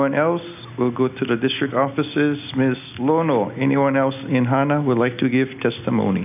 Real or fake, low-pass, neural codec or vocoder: real; 3.6 kHz; none